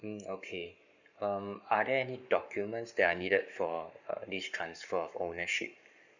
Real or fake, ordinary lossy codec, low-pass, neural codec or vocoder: fake; none; 7.2 kHz; codec, 44.1 kHz, 7.8 kbps, Pupu-Codec